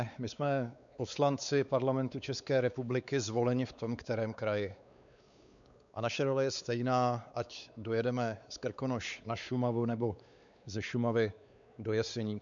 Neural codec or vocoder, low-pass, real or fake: codec, 16 kHz, 4 kbps, X-Codec, WavLM features, trained on Multilingual LibriSpeech; 7.2 kHz; fake